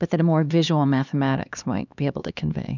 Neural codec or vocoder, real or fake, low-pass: codec, 16 kHz, 2 kbps, X-Codec, HuBERT features, trained on LibriSpeech; fake; 7.2 kHz